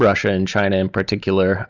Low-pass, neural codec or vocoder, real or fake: 7.2 kHz; none; real